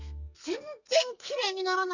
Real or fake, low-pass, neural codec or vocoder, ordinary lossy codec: fake; 7.2 kHz; codec, 32 kHz, 1.9 kbps, SNAC; none